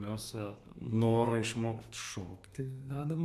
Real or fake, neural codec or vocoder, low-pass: fake; codec, 32 kHz, 1.9 kbps, SNAC; 14.4 kHz